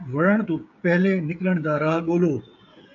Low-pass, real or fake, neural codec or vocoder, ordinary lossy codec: 7.2 kHz; fake; codec, 16 kHz, 16 kbps, FreqCodec, smaller model; MP3, 48 kbps